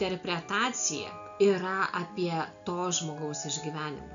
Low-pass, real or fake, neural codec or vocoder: 7.2 kHz; real; none